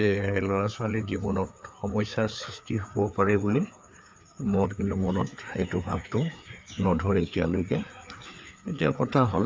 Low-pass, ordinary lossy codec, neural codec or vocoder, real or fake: none; none; codec, 16 kHz, 4 kbps, FreqCodec, larger model; fake